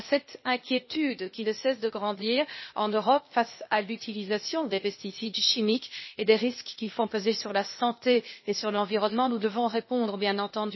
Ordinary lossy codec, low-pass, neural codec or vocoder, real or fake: MP3, 24 kbps; 7.2 kHz; codec, 16 kHz, 0.8 kbps, ZipCodec; fake